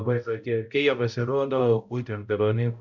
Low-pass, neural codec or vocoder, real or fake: 7.2 kHz; codec, 16 kHz, 0.5 kbps, X-Codec, HuBERT features, trained on balanced general audio; fake